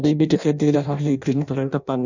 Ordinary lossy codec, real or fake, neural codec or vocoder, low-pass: none; fake; codec, 16 kHz in and 24 kHz out, 0.6 kbps, FireRedTTS-2 codec; 7.2 kHz